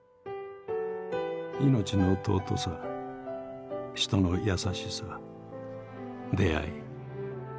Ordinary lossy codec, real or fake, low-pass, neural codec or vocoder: none; real; none; none